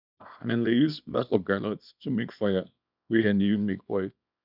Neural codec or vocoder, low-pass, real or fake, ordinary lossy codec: codec, 24 kHz, 0.9 kbps, WavTokenizer, small release; 5.4 kHz; fake; none